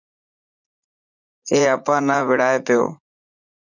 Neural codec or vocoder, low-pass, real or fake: vocoder, 44.1 kHz, 80 mel bands, Vocos; 7.2 kHz; fake